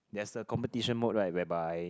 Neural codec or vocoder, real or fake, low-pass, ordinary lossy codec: none; real; none; none